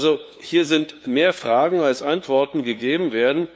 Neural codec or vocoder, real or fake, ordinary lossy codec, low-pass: codec, 16 kHz, 2 kbps, FunCodec, trained on LibriTTS, 25 frames a second; fake; none; none